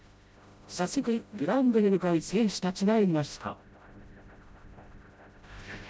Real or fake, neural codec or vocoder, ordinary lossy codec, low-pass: fake; codec, 16 kHz, 0.5 kbps, FreqCodec, smaller model; none; none